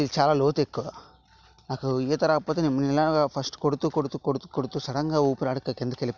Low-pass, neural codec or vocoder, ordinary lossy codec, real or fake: 7.2 kHz; none; Opus, 64 kbps; real